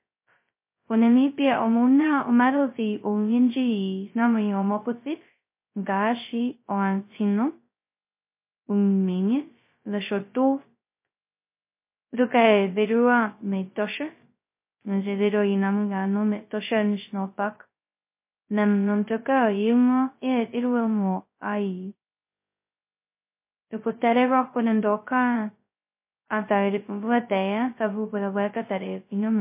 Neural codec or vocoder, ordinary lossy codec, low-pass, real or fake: codec, 16 kHz, 0.2 kbps, FocalCodec; MP3, 24 kbps; 3.6 kHz; fake